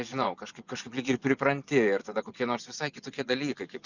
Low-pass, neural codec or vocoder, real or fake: 7.2 kHz; vocoder, 24 kHz, 100 mel bands, Vocos; fake